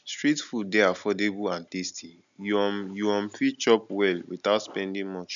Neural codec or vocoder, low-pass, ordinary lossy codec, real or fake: none; 7.2 kHz; none; real